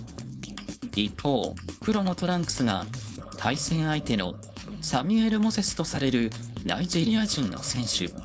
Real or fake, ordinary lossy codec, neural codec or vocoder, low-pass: fake; none; codec, 16 kHz, 4.8 kbps, FACodec; none